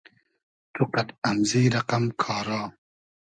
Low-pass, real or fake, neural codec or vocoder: 9.9 kHz; real; none